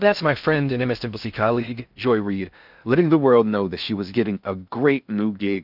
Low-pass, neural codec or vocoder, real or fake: 5.4 kHz; codec, 16 kHz in and 24 kHz out, 0.6 kbps, FocalCodec, streaming, 2048 codes; fake